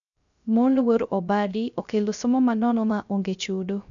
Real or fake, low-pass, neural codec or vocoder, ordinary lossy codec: fake; 7.2 kHz; codec, 16 kHz, 0.3 kbps, FocalCodec; none